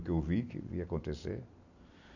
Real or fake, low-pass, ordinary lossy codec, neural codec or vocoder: real; 7.2 kHz; none; none